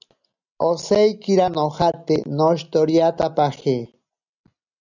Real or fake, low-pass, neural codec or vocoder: real; 7.2 kHz; none